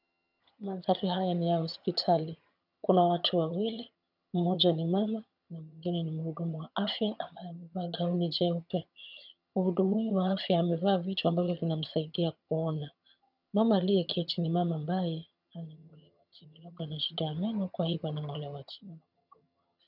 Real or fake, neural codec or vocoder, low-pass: fake; vocoder, 22.05 kHz, 80 mel bands, HiFi-GAN; 5.4 kHz